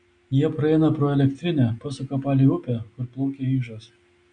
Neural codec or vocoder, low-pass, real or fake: none; 9.9 kHz; real